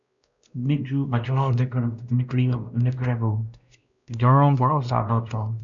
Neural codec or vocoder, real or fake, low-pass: codec, 16 kHz, 1 kbps, X-Codec, WavLM features, trained on Multilingual LibriSpeech; fake; 7.2 kHz